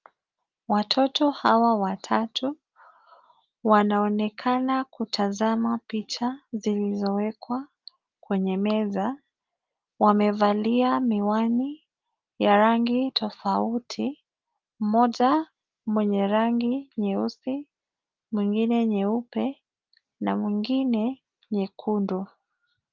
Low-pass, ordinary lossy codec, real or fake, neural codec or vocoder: 7.2 kHz; Opus, 32 kbps; real; none